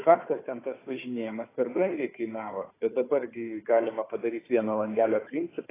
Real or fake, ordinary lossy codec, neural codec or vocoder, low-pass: fake; AAC, 16 kbps; codec, 16 kHz, 4 kbps, FunCodec, trained on Chinese and English, 50 frames a second; 3.6 kHz